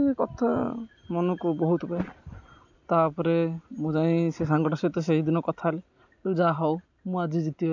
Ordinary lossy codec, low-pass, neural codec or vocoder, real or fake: none; 7.2 kHz; none; real